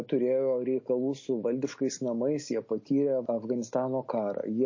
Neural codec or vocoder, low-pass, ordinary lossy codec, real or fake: none; 7.2 kHz; MP3, 32 kbps; real